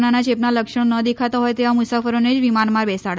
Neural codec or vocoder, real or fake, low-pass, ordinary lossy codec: none; real; none; none